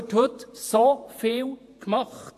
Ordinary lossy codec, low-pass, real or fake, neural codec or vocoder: AAC, 64 kbps; 14.4 kHz; fake; vocoder, 48 kHz, 128 mel bands, Vocos